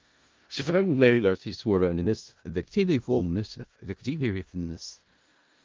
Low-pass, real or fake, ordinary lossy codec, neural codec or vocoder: 7.2 kHz; fake; Opus, 32 kbps; codec, 16 kHz in and 24 kHz out, 0.4 kbps, LongCat-Audio-Codec, four codebook decoder